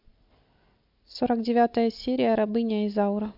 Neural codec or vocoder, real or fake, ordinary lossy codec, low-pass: none; real; MP3, 48 kbps; 5.4 kHz